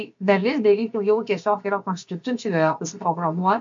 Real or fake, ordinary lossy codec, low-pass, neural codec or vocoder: fake; MP3, 48 kbps; 7.2 kHz; codec, 16 kHz, about 1 kbps, DyCAST, with the encoder's durations